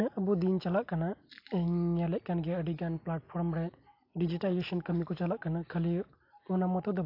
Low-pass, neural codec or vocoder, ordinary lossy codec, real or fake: 5.4 kHz; none; AAC, 32 kbps; real